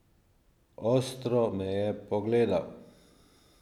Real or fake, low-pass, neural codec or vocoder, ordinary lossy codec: real; 19.8 kHz; none; none